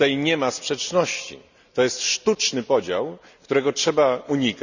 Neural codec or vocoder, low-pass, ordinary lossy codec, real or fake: none; 7.2 kHz; none; real